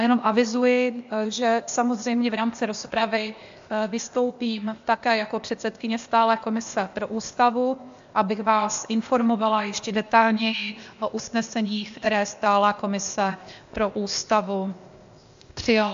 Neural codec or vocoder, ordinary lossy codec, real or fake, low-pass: codec, 16 kHz, 0.8 kbps, ZipCodec; AAC, 64 kbps; fake; 7.2 kHz